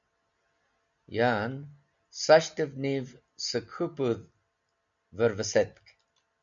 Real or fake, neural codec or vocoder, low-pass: real; none; 7.2 kHz